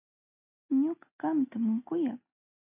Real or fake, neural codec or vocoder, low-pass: real; none; 3.6 kHz